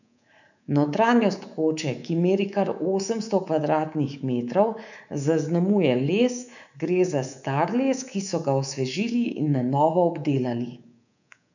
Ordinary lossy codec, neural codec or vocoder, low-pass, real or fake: none; codec, 24 kHz, 3.1 kbps, DualCodec; 7.2 kHz; fake